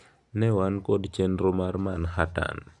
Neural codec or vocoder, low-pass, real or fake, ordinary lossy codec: none; 10.8 kHz; real; none